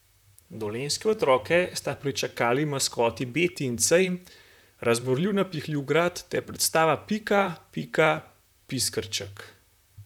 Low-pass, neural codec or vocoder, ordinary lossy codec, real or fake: 19.8 kHz; vocoder, 44.1 kHz, 128 mel bands, Pupu-Vocoder; none; fake